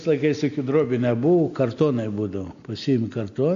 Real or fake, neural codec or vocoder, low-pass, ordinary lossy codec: real; none; 7.2 kHz; AAC, 48 kbps